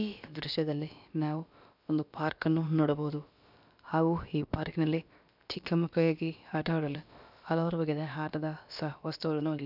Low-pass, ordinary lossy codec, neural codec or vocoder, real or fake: 5.4 kHz; none; codec, 16 kHz, about 1 kbps, DyCAST, with the encoder's durations; fake